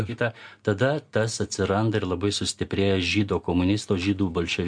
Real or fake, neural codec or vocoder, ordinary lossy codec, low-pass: real; none; MP3, 64 kbps; 9.9 kHz